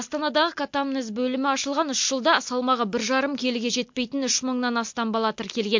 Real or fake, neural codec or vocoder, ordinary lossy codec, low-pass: real; none; MP3, 48 kbps; 7.2 kHz